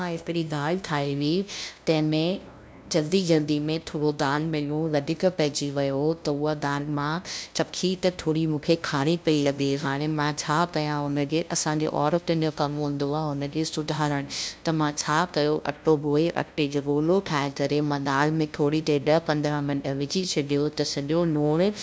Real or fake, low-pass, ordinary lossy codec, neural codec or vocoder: fake; none; none; codec, 16 kHz, 0.5 kbps, FunCodec, trained on LibriTTS, 25 frames a second